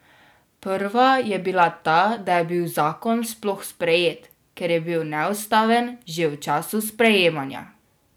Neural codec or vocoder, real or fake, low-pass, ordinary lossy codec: none; real; none; none